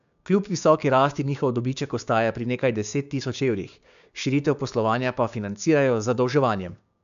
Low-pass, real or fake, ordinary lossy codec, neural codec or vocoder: 7.2 kHz; fake; AAC, 96 kbps; codec, 16 kHz, 6 kbps, DAC